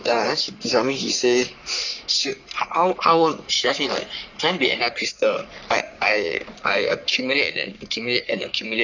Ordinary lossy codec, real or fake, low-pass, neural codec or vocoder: none; fake; 7.2 kHz; codec, 44.1 kHz, 3.4 kbps, Pupu-Codec